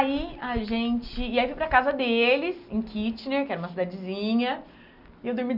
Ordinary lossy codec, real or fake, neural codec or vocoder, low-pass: none; real; none; 5.4 kHz